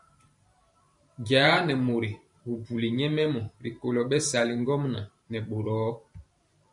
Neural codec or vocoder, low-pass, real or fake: vocoder, 44.1 kHz, 128 mel bands every 512 samples, BigVGAN v2; 10.8 kHz; fake